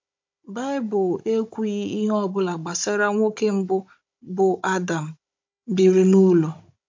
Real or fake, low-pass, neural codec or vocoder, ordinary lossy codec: fake; 7.2 kHz; codec, 16 kHz, 16 kbps, FunCodec, trained on Chinese and English, 50 frames a second; MP3, 48 kbps